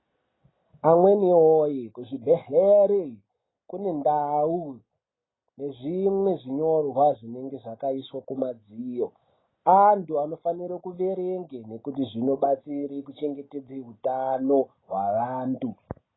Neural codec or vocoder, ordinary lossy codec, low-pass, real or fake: none; AAC, 16 kbps; 7.2 kHz; real